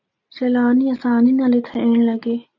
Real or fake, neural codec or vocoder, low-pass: real; none; 7.2 kHz